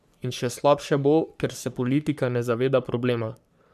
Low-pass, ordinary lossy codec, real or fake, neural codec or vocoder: 14.4 kHz; none; fake; codec, 44.1 kHz, 3.4 kbps, Pupu-Codec